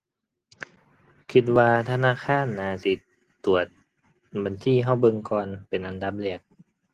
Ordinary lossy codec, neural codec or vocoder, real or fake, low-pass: Opus, 16 kbps; none; real; 14.4 kHz